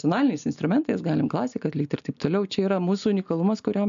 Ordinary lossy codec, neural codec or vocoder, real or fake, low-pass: AAC, 96 kbps; none; real; 7.2 kHz